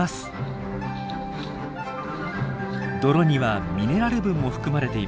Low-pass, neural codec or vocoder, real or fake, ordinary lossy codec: none; none; real; none